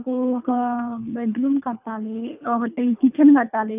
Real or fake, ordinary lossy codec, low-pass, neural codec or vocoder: fake; AAC, 32 kbps; 3.6 kHz; codec, 24 kHz, 3 kbps, HILCodec